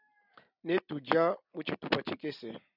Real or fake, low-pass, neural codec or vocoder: real; 5.4 kHz; none